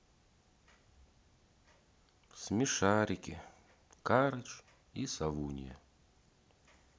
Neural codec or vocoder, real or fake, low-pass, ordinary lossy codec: none; real; none; none